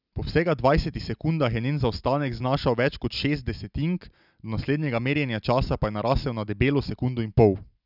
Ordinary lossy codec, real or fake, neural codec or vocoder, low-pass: none; real; none; 5.4 kHz